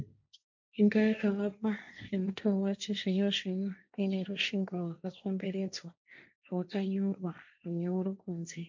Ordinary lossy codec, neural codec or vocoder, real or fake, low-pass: MP3, 64 kbps; codec, 16 kHz, 1.1 kbps, Voila-Tokenizer; fake; 7.2 kHz